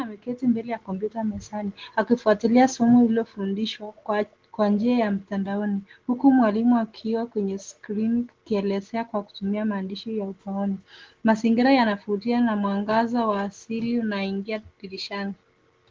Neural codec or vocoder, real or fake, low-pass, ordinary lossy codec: none; real; 7.2 kHz; Opus, 16 kbps